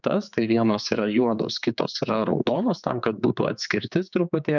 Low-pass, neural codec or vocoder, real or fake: 7.2 kHz; codec, 16 kHz, 4 kbps, X-Codec, HuBERT features, trained on general audio; fake